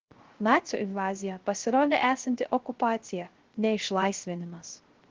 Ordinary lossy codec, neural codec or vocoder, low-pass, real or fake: Opus, 16 kbps; codec, 16 kHz, 0.3 kbps, FocalCodec; 7.2 kHz; fake